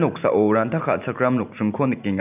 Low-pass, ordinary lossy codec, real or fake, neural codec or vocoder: 3.6 kHz; none; real; none